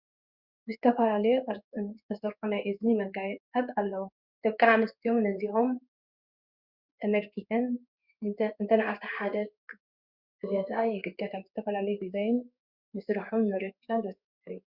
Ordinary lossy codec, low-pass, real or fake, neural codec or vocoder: Opus, 64 kbps; 5.4 kHz; fake; codec, 16 kHz in and 24 kHz out, 1 kbps, XY-Tokenizer